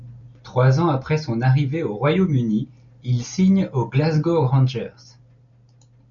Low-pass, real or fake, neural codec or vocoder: 7.2 kHz; real; none